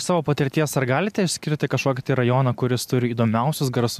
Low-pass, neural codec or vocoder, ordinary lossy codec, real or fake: 14.4 kHz; none; MP3, 96 kbps; real